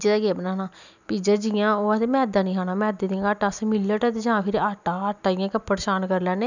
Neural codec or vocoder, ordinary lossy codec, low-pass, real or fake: none; none; 7.2 kHz; real